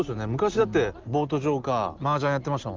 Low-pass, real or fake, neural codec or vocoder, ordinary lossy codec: 7.2 kHz; real; none; Opus, 24 kbps